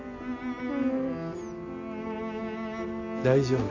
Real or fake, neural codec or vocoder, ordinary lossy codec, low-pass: real; none; none; 7.2 kHz